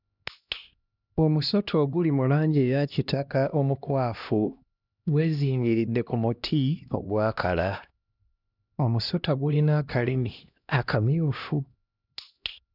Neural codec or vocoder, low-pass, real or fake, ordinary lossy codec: codec, 16 kHz, 1 kbps, X-Codec, HuBERT features, trained on LibriSpeech; 5.4 kHz; fake; none